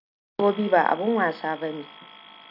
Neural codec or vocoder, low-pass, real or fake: none; 5.4 kHz; real